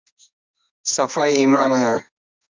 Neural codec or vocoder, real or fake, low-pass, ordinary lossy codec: codec, 24 kHz, 0.9 kbps, WavTokenizer, medium music audio release; fake; 7.2 kHz; MP3, 64 kbps